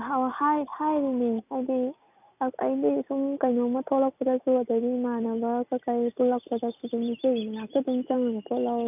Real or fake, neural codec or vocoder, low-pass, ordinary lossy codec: real; none; 3.6 kHz; none